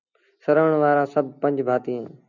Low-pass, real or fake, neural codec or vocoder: 7.2 kHz; real; none